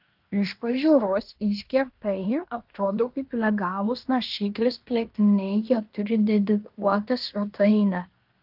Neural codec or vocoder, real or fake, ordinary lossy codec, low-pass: codec, 16 kHz in and 24 kHz out, 0.9 kbps, LongCat-Audio-Codec, fine tuned four codebook decoder; fake; Opus, 32 kbps; 5.4 kHz